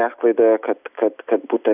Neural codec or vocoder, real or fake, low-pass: none; real; 3.6 kHz